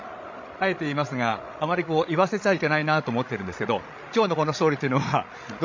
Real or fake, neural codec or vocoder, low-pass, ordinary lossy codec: fake; codec, 16 kHz, 16 kbps, FreqCodec, larger model; 7.2 kHz; MP3, 64 kbps